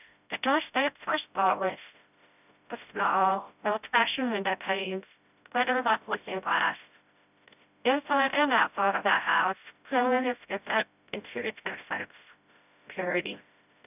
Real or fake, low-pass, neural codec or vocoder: fake; 3.6 kHz; codec, 16 kHz, 0.5 kbps, FreqCodec, smaller model